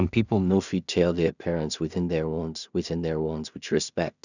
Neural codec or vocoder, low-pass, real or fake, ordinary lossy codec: codec, 16 kHz in and 24 kHz out, 0.4 kbps, LongCat-Audio-Codec, two codebook decoder; 7.2 kHz; fake; none